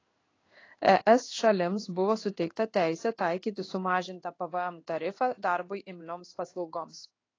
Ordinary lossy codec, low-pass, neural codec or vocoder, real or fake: AAC, 32 kbps; 7.2 kHz; codec, 16 kHz in and 24 kHz out, 1 kbps, XY-Tokenizer; fake